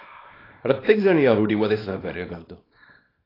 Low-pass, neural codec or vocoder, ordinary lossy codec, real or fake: 5.4 kHz; codec, 24 kHz, 0.9 kbps, WavTokenizer, small release; AAC, 24 kbps; fake